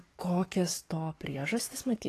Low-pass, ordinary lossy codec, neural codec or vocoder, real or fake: 14.4 kHz; AAC, 48 kbps; codec, 44.1 kHz, 7.8 kbps, DAC; fake